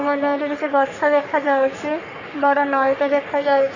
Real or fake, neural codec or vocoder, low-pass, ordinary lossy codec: fake; codec, 44.1 kHz, 3.4 kbps, Pupu-Codec; 7.2 kHz; none